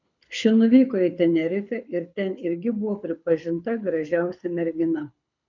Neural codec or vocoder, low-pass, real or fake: codec, 24 kHz, 6 kbps, HILCodec; 7.2 kHz; fake